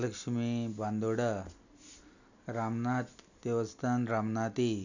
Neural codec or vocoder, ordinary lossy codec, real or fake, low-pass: none; none; real; 7.2 kHz